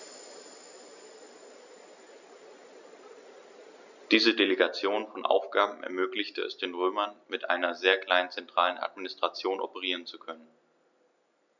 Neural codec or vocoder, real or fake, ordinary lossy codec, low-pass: none; real; none; 7.2 kHz